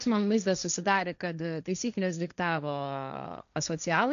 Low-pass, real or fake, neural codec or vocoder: 7.2 kHz; fake; codec, 16 kHz, 1.1 kbps, Voila-Tokenizer